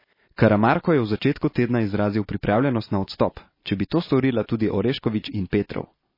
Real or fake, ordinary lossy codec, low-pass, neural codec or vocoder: real; MP3, 24 kbps; 5.4 kHz; none